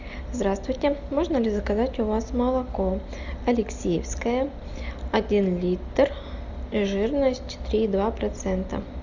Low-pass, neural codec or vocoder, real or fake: 7.2 kHz; none; real